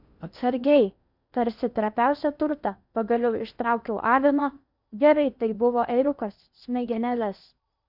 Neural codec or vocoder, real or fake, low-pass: codec, 16 kHz in and 24 kHz out, 0.8 kbps, FocalCodec, streaming, 65536 codes; fake; 5.4 kHz